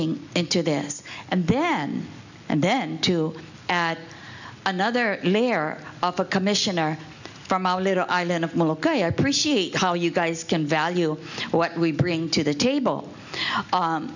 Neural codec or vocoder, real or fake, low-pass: none; real; 7.2 kHz